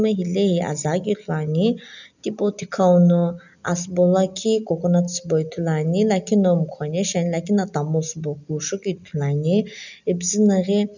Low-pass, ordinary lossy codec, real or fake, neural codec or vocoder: 7.2 kHz; none; real; none